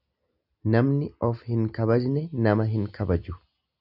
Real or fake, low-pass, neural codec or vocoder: real; 5.4 kHz; none